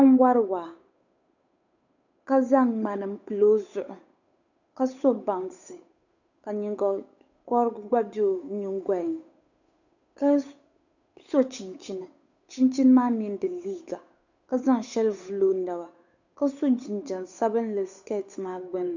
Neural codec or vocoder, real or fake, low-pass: codec, 16 kHz, 8 kbps, FunCodec, trained on Chinese and English, 25 frames a second; fake; 7.2 kHz